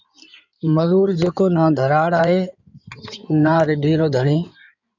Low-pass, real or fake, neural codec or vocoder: 7.2 kHz; fake; codec, 16 kHz in and 24 kHz out, 2.2 kbps, FireRedTTS-2 codec